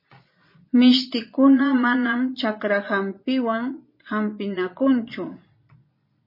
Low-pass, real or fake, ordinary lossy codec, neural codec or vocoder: 5.4 kHz; fake; MP3, 24 kbps; vocoder, 22.05 kHz, 80 mel bands, Vocos